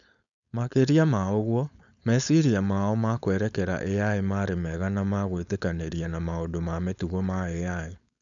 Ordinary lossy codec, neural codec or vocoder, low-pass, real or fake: none; codec, 16 kHz, 4.8 kbps, FACodec; 7.2 kHz; fake